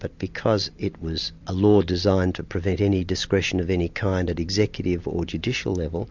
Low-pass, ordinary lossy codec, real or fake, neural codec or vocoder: 7.2 kHz; MP3, 64 kbps; real; none